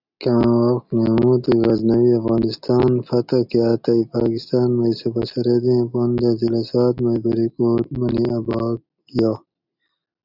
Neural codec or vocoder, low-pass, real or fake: none; 5.4 kHz; real